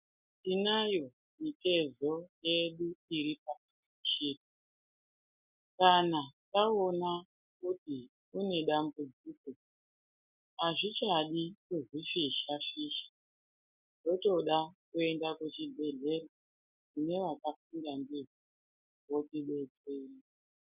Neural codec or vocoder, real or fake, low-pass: none; real; 3.6 kHz